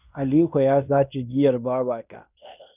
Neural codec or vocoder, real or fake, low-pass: codec, 16 kHz in and 24 kHz out, 0.9 kbps, LongCat-Audio-Codec, fine tuned four codebook decoder; fake; 3.6 kHz